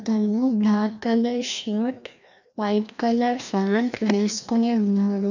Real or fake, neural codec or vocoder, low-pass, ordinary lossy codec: fake; codec, 16 kHz, 1 kbps, FreqCodec, larger model; 7.2 kHz; none